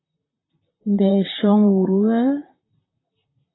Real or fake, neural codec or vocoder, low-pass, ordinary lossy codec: fake; vocoder, 44.1 kHz, 128 mel bands, Pupu-Vocoder; 7.2 kHz; AAC, 16 kbps